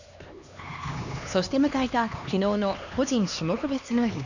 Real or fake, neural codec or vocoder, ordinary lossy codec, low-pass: fake; codec, 16 kHz, 2 kbps, X-Codec, HuBERT features, trained on LibriSpeech; none; 7.2 kHz